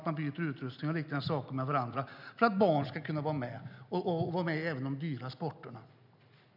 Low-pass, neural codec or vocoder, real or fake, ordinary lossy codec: 5.4 kHz; none; real; none